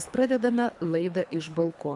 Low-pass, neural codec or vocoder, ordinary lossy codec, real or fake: 10.8 kHz; codec, 24 kHz, 3 kbps, HILCodec; AAC, 64 kbps; fake